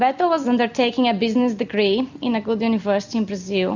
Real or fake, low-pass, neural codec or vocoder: real; 7.2 kHz; none